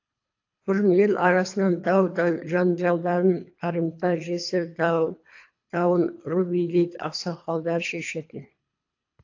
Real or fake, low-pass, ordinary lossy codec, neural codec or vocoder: fake; 7.2 kHz; AAC, 48 kbps; codec, 24 kHz, 3 kbps, HILCodec